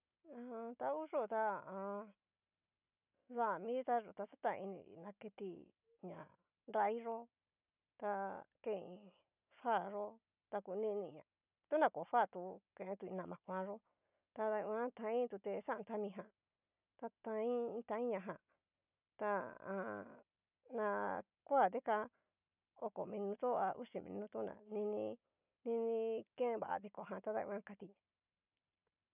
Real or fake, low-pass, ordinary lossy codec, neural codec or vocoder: real; 3.6 kHz; none; none